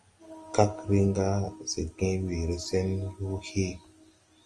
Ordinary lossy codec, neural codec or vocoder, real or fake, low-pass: Opus, 24 kbps; none; real; 9.9 kHz